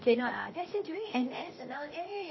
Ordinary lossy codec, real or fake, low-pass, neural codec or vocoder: MP3, 24 kbps; fake; 7.2 kHz; codec, 16 kHz, 0.8 kbps, ZipCodec